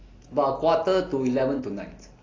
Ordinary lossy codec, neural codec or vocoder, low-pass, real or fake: AAC, 32 kbps; none; 7.2 kHz; real